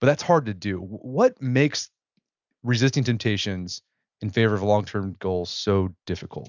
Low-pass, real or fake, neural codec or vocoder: 7.2 kHz; real; none